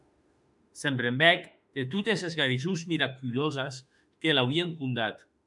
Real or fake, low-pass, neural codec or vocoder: fake; 10.8 kHz; autoencoder, 48 kHz, 32 numbers a frame, DAC-VAE, trained on Japanese speech